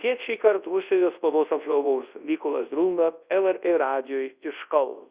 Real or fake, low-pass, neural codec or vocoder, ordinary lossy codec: fake; 3.6 kHz; codec, 24 kHz, 0.9 kbps, WavTokenizer, large speech release; Opus, 64 kbps